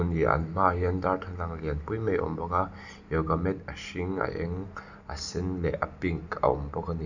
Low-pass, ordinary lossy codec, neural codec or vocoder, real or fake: 7.2 kHz; none; none; real